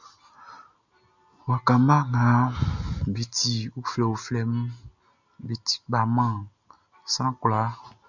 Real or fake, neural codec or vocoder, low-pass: real; none; 7.2 kHz